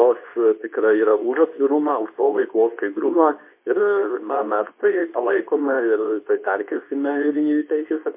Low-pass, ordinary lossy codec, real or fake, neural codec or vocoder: 3.6 kHz; MP3, 24 kbps; fake; codec, 24 kHz, 0.9 kbps, WavTokenizer, medium speech release version 2